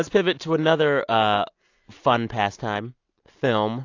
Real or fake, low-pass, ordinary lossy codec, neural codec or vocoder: real; 7.2 kHz; AAC, 48 kbps; none